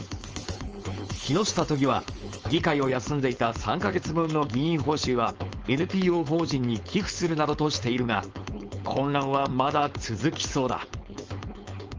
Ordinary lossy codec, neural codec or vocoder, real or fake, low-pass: Opus, 24 kbps; codec, 16 kHz, 4.8 kbps, FACodec; fake; 7.2 kHz